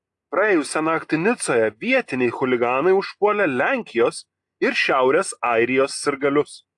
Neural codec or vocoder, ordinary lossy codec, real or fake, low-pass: none; AAC, 64 kbps; real; 10.8 kHz